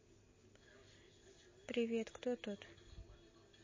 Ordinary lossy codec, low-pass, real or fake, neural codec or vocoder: MP3, 32 kbps; 7.2 kHz; real; none